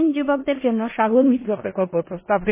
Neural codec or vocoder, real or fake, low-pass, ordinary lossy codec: codec, 16 kHz in and 24 kHz out, 0.4 kbps, LongCat-Audio-Codec, four codebook decoder; fake; 3.6 kHz; MP3, 16 kbps